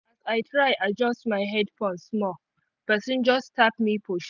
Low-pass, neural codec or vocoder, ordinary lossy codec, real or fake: 7.2 kHz; none; none; real